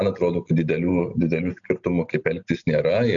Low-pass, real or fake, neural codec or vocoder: 7.2 kHz; real; none